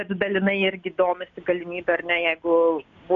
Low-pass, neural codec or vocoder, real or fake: 7.2 kHz; none; real